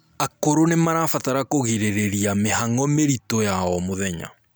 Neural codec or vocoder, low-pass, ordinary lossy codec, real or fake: none; none; none; real